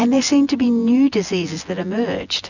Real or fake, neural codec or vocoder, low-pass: fake; vocoder, 24 kHz, 100 mel bands, Vocos; 7.2 kHz